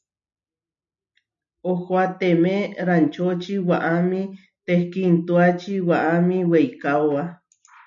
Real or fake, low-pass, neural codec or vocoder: real; 7.2 kHz; none